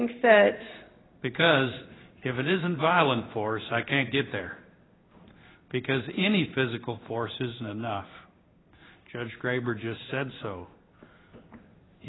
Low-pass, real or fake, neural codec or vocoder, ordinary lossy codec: 7.2 kHz; fake; codec, 16 kHz in and 24 kHz out, 1 kbps, XY-Tokenizer; AAC, 16 kbps